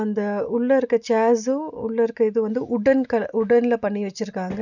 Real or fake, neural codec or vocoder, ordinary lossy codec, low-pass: real; none; none; 7.2 kHz